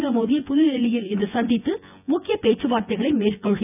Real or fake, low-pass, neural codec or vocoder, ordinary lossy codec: fake; 3.6 kHz; vocoder, 24 kHz, 100 mel bands, Vocos; none